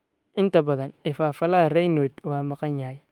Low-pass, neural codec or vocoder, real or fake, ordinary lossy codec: 19.8 kHz; autoencoder, 48 kHz, 32 numbers a frame, DAC-VAE, trained on Japanese speech; fake; Opus, 32 kbps